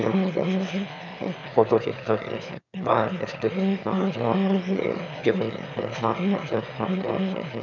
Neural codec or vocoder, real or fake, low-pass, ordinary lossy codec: autoencoder, 22.05 kHz, a latent of 192 numbers a frame, VITS, trained on one speaker; fake; 7.2 kHz; none